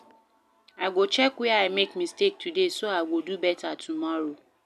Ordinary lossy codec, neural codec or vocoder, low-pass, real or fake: none; none; 14.4 kHz; real